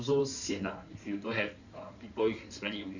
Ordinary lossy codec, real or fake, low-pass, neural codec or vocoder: none; fake; 7.2 kHz; codec, 16 kHz in and 24 kHz out, 2.2 kbps, FireRedTTS-2 codec